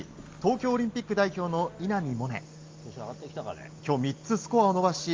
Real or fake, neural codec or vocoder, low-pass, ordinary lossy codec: real; none; 7.2 kHz; Opus, 32 kbps